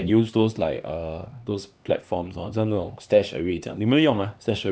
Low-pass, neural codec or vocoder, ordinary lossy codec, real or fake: none; codec, 16 kHz, 2 kbps, X-Codec, HuBERT features, trained on LibriSpeech; none; fake